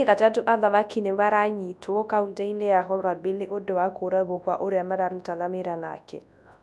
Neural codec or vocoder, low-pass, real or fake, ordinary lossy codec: codec, 24 kHz, 0.9 kbps, WavTokenizer, large speech release; none; fake; none